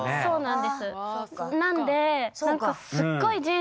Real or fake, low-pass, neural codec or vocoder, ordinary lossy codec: real; none; none; none